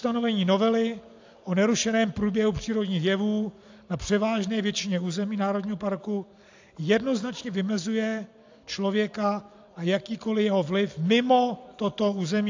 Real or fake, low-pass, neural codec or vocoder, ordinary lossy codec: real; 7.2 kHz; none; AAC, 48 kbps